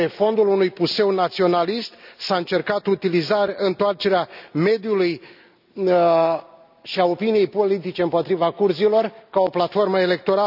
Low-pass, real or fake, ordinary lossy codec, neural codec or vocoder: 5.4 kHz; real; none; none